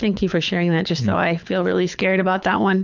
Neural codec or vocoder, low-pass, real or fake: codec, 24 kHz, 6 kbps, HILCodec; 7.2 kHz; fake